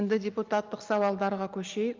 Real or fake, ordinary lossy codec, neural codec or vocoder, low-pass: real; Opus, 24 kbps; none; 7.2 kHz